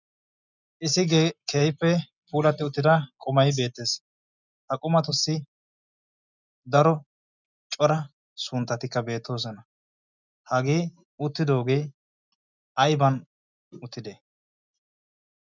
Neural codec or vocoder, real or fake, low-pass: none; real; 7.2 kHz